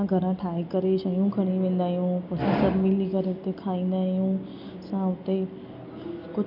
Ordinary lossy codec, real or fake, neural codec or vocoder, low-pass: none; real; none; 5.4 kHz